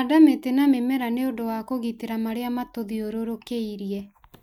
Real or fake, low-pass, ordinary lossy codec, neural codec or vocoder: real; 19.8 kHz; none; none